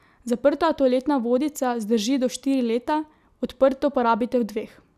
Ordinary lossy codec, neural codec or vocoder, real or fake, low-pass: none; none; real; 14.4 kHz